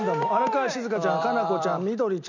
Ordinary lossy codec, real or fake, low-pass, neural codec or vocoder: none; real; 7.2 kHz; none